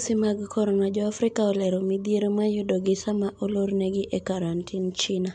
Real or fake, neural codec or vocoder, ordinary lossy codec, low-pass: real; none; MP3, 64 kbps; 9.9 kHz